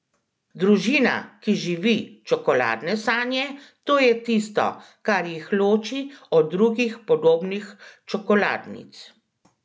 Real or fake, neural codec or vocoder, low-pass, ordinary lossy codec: real; none; none; none